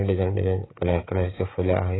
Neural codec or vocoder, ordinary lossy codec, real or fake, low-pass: codec, 16 kHz, 16 kbps, FreqCodec, smaller model; AAC, 16 kbps; fake; 7.2 kHz